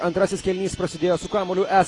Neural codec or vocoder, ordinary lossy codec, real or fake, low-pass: none; AAC, 32 kbps; real; 10.8 kHz